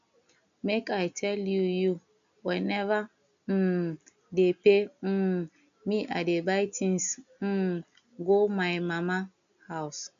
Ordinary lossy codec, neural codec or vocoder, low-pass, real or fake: AAC, 96 kbps; none; 7.2 kHz; real